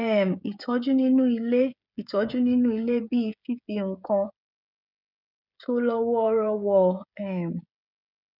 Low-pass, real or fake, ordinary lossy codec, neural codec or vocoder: 5.4 kHz; fake; none; codec, 16 kHz, 16 kbps, FreqCodec, smaller model